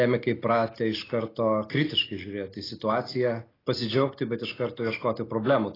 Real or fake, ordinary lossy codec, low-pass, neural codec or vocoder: real; AAC, 24 kbps; 5.4 kHz; none